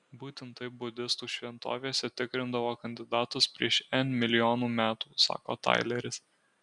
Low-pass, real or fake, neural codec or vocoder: 10.8 kHz; real; none